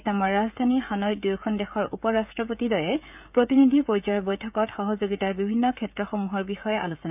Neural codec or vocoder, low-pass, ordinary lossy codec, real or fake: codec, 16 kHz, 16 kbps, FreqCodec, smaller model; 3.6 kHz; none; fake